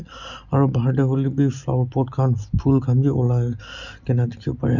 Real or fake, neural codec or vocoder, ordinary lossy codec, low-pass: real; none; none; 7.2 kHz